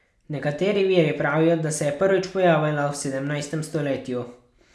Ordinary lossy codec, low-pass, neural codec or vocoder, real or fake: none; none; none; real